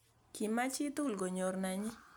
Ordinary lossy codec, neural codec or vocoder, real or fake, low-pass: none; none; real; none